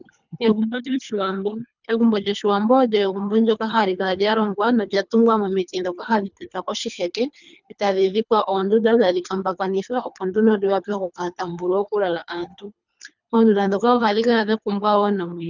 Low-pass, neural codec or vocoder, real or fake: 7.2 kHz; codec, 24 kHz, 3 kbps, HILCodec; fake